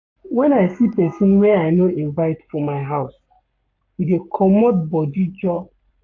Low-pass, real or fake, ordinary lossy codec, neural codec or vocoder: 7.2 kHz; fake; AAC, 48 kbps; codec, 44.1 kHz, 7.8 kbps, Pupu-Codec